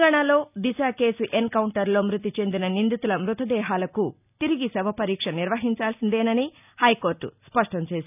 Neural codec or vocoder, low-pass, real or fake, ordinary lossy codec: none; 3.6 kHz; real; none